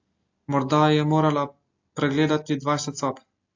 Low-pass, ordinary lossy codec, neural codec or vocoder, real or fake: 7.2 kHz; AAC, 48 kbps; none; real